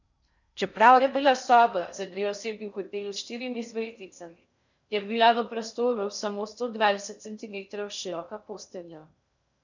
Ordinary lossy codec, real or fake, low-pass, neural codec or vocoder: none; fake; 7.2 kHz; codec, 16 kHz in and 24 kHz out, 0.6 kbps, FocalCodec, streaming, 4096 codes